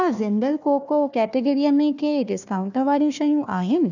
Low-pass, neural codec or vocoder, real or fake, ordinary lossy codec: 7.2 kHz; codec, 16 kHz, 1 kbps, FunCodec, trained on Chinese and English, 50 frames a second; fake; none